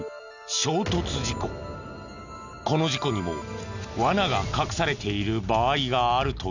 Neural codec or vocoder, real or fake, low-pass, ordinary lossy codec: none; real; 7.2 kHz; none